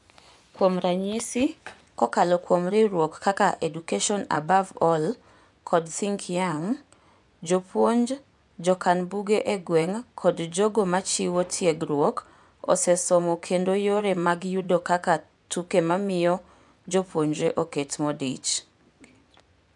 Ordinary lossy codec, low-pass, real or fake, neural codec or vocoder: none; 10.8 kHz; fake; vocoder, 24 kHz, 100 mel bands, Vocos